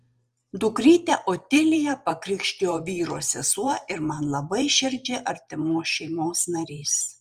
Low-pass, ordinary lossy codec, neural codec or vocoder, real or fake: 14.4 kHz; Opus, 32 kbps; vocoder, 44.1 kHz, 128 mel bands every 512 samples, BigVGAN v2; fake